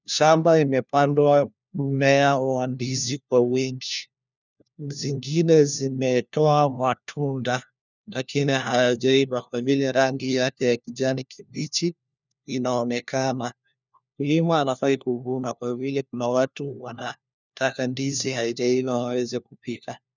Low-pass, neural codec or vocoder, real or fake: 7.2 kHz; codec, 16 kHz, 1 kbps, FunCodec, trained on LibriTTS, 50 frames a second; fake